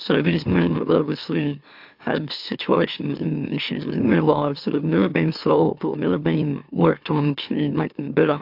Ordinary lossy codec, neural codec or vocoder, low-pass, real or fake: AAC, 48 kbps; autoencoder, 44.1 kHz, a latent of 192 numbers a frame, MeloTTS; 5.4 kHz; fake